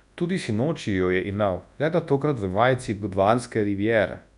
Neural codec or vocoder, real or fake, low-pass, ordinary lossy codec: codec, 24 kHz, 0.9 kbps, WavTokenizer, large speech release; fake; 10.8 kHz; none